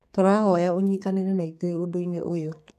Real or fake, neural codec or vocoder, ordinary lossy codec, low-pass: fake; codec, 32 kHz, 1.9 kbps, SNAC; none; 14.4 kHz